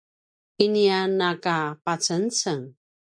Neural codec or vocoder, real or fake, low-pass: none; real; 9.9 kHz